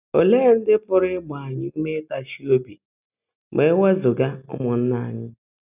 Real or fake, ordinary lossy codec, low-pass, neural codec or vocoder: real; none; 3.6 kHz; none